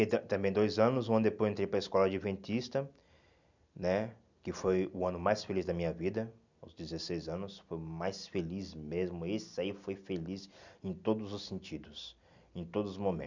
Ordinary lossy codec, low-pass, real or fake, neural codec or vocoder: none; 7.2 kHz; real; none